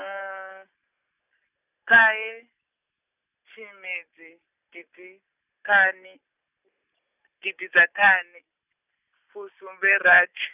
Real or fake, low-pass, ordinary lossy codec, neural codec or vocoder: real; 3.6 kHz; none; none